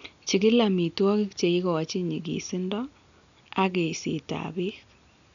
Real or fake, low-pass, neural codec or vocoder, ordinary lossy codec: real; 7.2 kHz; none; none